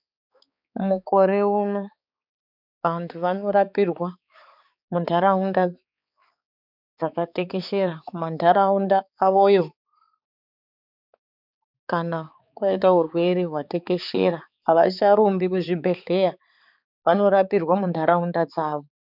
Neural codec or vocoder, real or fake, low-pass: codec, 16 kHz, 4 kbps, X-Codec, HuBERT features, trained on balanced general audio; fake; 5.4 kHz